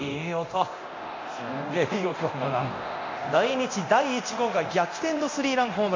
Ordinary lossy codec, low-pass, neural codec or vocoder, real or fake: MP3, 48 kbps; 7.2 kHz; codec, 24 kHz, 0.9 kbps, DualCodec; fake